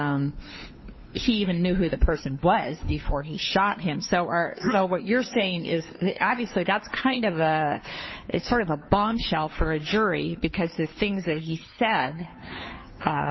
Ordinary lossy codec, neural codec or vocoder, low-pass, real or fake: MP3, 24 kbps; codec, 16 kHz, 2 kbps, FunCodec, trained on Chinese and English, 25 frames a second; 7.2 kHz; fake